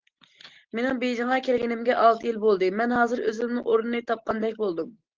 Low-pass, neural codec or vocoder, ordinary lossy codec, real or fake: 7.2 kHz; none; Opus, 16 kbps; real